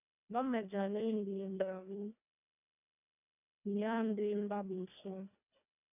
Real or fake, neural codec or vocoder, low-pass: fake; codec, 24 kHz, 1.5 kbps, HILCodec; 3.6 kHz